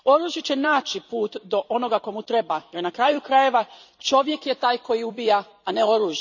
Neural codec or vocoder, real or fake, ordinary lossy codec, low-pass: vocoder, 44.1 kHz, 128 mel bands every 256 samples, BigVGAN v2; fake; none; 7.2 kHz